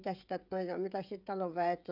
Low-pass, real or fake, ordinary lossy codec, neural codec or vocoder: 5.4 kHz; fake; none; codec, 16 kHz, 16 kbps, FreqCodec, smaller model